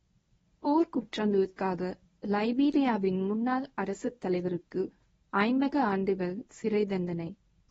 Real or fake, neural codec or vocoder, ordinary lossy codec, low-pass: fake; codec, 24 kHz, 0.9 kbps, WavTokenizer, medium speech release version 1; AAC, 24 kbps; 10.8 kHz